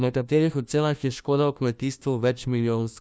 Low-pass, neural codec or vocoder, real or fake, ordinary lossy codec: none; codec, 16 kHz, 1 kbps, FunCodec, trained on LibriTTS, 50 frames a second; fake; none